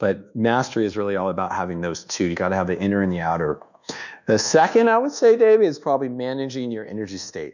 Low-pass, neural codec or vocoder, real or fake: 7.2 kHz; codec, 24 kHz, 1.2 kbps, DualCodec; fake